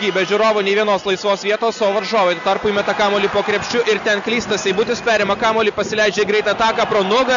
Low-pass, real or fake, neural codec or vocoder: 7.2 kHz; real; none